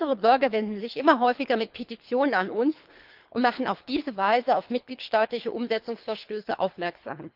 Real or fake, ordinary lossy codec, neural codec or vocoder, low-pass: fake; Opus, 24 kbps; codec, 24 kHz, 3 kbps, HILCodec; 5.4 kHz